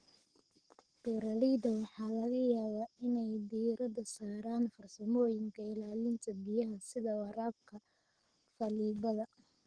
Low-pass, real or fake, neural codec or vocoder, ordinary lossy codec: 9.9 kHz; fake; codec, 44.1 kHz, 7.8 kbps, Pupu-Codec; Opus, 16 kbps